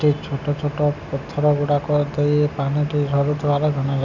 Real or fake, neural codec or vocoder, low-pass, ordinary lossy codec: real; none; 7.2 kHz; none